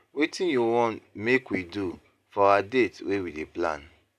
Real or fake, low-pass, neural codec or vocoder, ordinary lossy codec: real; 14.4 kHz; none; none